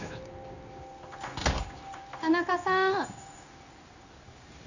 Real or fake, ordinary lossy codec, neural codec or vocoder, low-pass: fake; none; codec, 16 kHz in and 24 kHz out, 1 kbps, XY-Tokenizer; 7.2 kHz